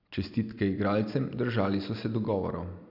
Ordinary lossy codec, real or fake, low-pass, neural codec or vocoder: none; real; 5.4 kHz; none